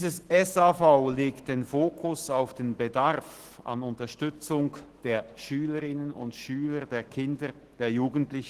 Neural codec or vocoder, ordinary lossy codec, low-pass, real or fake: autoencoder, 48 kHz, 128 numbers a frame, DAC-VAE, trained on Japanese speech; Opus, 16 kbps; 14.4 kHz; fake